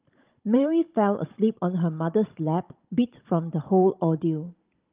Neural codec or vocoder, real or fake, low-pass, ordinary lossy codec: codec, 16 kHz, 16 kbps, FunCodec, trained on Chinese and English, 50 frames a second; fake; 3.6 kHz; Opus, 24 kbps